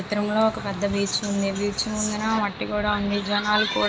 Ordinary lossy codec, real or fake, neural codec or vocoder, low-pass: none; real; none; none